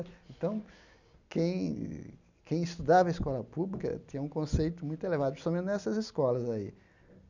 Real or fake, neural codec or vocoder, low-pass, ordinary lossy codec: real; none; 7.2 kHz; none